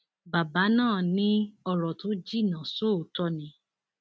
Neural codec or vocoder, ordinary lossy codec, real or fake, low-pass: none; none; real; none